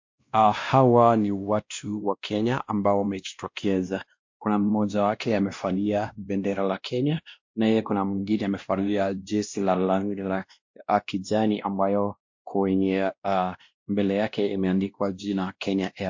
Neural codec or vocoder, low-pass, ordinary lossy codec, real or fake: codec, 16 kHz, 1 kbps, X-Codec, WavLM features, trained on Multilingual LibriSpeech; 7.2 kHz; MP3, 48 kbps; fake